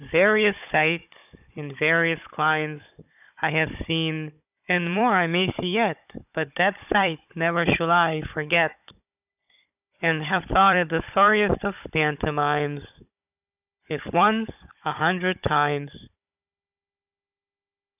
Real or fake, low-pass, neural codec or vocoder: fake; 3.6 kHz; codec, 16 kHz, 16 kbps, FunCodec, trained on Chinese and English, 50 frames a second